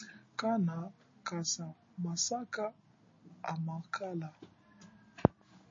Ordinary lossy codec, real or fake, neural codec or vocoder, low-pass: MP3, 32 kbps; real; none; 7.2 kHz